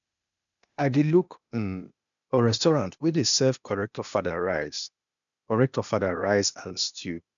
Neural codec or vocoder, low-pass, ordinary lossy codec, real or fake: codec, 16 kHz, 0.8 kbps, ZipCodec; 7.2 kHz; none; fake